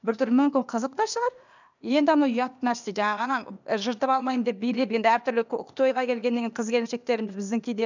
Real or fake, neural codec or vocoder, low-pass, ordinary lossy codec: fake; codec, 16 kHz, 0.8 kbps, ZipCodec; 7.2 kHz; none